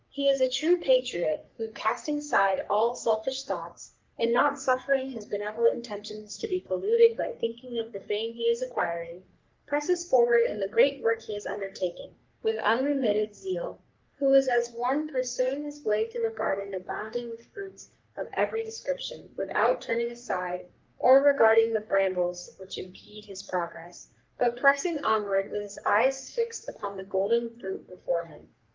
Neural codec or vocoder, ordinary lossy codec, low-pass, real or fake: codec, 44.1 kHz, 3.4 kbps, Pupu-Codec; Opus, 32 kbps; 7.2 kHz; fake